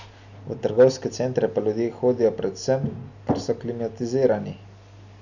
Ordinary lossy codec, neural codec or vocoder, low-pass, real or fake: none; none; 7.2 kHz; real